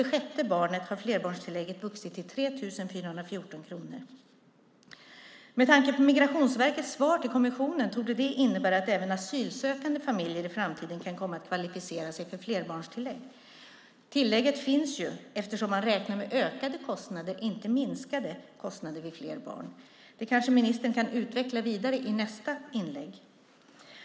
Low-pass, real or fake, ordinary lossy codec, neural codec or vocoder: none; real; none; none